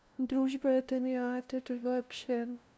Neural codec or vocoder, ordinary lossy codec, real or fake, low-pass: codec, 16 kHz, 0.5 kbps, FunCodec, trained on LibriTTS, 25 frames a second; none; fake; none